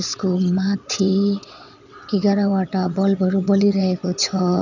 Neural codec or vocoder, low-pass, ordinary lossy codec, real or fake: vocoder, 22.05 kHz, 80 mel bands, WaveNeXt; 7.2 kHz; none; fake